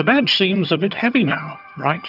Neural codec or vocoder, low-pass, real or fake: vocoder, 22.05 kHz, 80 mel bands, HiFi-GAN; 5.4 kHz; fake